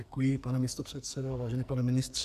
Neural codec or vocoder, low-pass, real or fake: codec, 44.1 kHz, 2.6 kbps, SNAC; 14.4 kHz; fake